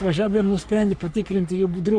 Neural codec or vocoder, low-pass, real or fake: codec, 44.1 kHz, 3.4 kbps, Pupu-Codec; 9.9 kHz; fake